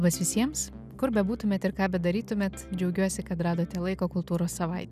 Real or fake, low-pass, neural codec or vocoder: real; 14.4 kHz; none